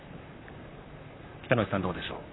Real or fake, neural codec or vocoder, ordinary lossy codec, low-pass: fake; vocoder, 44.1 kHz, 128 mel bands, Pupu-Vocoder; AAC, 16 kbps; 7.2 kHz